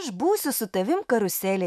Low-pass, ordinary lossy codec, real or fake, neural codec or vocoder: 14.4 kHz; MP3, 96 kbps; fake; autoencoder, 48 kHz, 128 numbers a frame, DAC-VAE, trained on Japanese speech